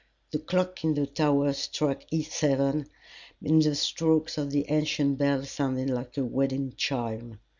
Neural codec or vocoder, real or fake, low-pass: none; real; 7.2 kHz